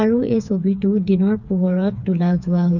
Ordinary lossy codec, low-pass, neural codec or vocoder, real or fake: none; 7.2 kHz; codec, 16 kHz, 4 kbps, FreqCodec, smaller model; fake